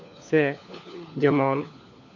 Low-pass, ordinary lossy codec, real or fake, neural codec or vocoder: 7.2 kHz; none; fake; codec, 16 kHz, 4 kbps, FunCodec, trained on LibriTTS, 50 frames a second